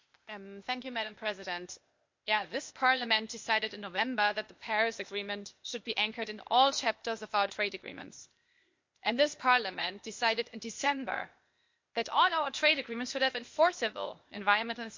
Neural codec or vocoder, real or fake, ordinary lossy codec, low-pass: codec, 16 kHz, 0.8 kbps, ZipCodec; fake; MP3, 48 kbps; 7.2 kHz